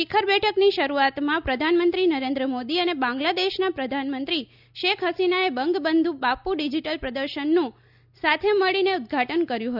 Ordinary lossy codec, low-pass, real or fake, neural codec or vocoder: none; 5.4 kHz; real; none